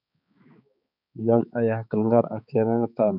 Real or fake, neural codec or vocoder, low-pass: fake; codec, 16 kHz, 4 kbps, X-Codec, HuBERT features, trained on balanced general audio; 5.4 kHz